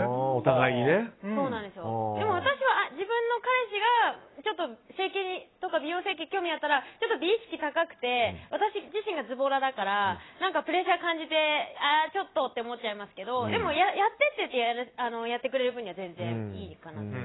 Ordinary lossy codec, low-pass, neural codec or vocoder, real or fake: AAC, 16 kbps; 7.2 kHz; none; real